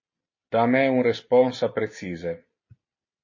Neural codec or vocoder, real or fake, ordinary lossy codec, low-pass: none; real; MP3, 32 kbps; 7.2 kHz